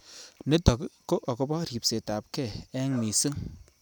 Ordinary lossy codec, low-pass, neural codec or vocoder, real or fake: none; none; none; real